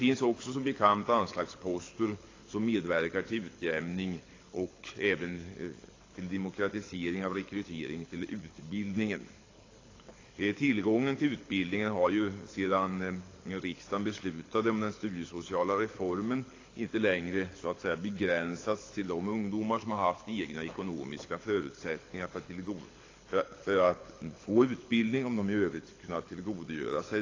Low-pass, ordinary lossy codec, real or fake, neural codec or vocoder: 7.2 kHz; AAC, 32 kbps; fake; codec, 24 kHz, 6 kbps, HILCodec